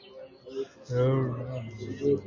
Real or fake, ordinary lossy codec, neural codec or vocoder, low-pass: real; MP3, 32 kbps; none; 7.2 kHz